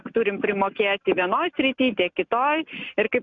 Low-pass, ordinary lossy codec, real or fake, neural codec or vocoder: 7.2 kHz; MP3, 96 kbps; real; none